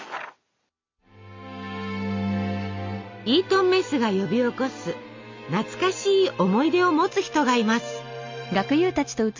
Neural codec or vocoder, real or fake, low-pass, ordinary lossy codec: none; real; 7.2 kHz; MP3, 48 kbps